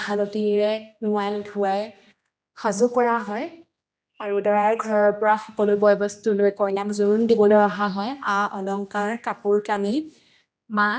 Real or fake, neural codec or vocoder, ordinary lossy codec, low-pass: fake; codec, 16 kHz, 1 kbps, X-Codec, HuBERT features, trained on general audio; none; none